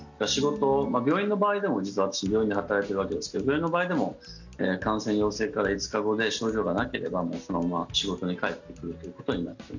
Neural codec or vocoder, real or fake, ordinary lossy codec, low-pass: none; real; none; 7.2 kHz